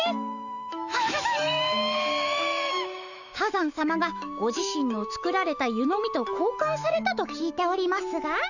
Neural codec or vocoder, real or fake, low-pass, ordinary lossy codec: autoencoder, 48 kHz, 128 numbers a frame, DAC-VAE, trained on Japanese speech; fake; 7.2 kHz; none